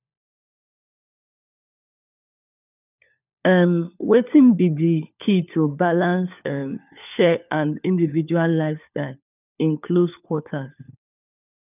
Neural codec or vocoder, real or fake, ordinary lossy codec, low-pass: codec, 16 kHz, 4 kbps, FunCodec, trained on LibriTTS, 50 frames a second; fake; none; 3.6 kHz